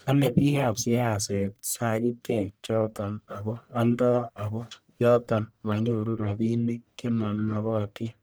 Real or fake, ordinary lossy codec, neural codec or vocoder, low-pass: fake; none; codec, 44.1 kHz, 1.7 kbps, Pupu-Codec; none